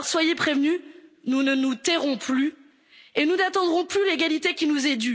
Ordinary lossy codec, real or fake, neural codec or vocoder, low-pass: none; real; none; none